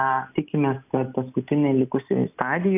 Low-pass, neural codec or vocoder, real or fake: 3.6 kHz; none; real